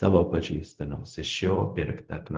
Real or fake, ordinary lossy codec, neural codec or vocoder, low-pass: fake; Opus, 16 kbps; codec, 16 kHz, 0.4 kbps, LongCat-Audio-Codec; 7.2 kHz